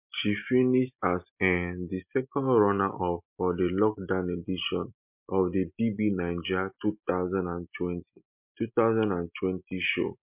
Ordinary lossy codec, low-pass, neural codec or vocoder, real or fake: MP3, 32 kbps; 3.6 kHz; none; real